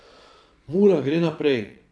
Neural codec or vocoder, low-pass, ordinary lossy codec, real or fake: vocoder, 22.05 kHz, 80 mel bands, Vocos; none; none; fake